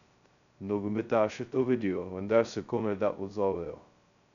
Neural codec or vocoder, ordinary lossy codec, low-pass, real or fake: codec, 16 kHz, 0.2 kbps, FocalCodec; none; 7.2 kHz; fake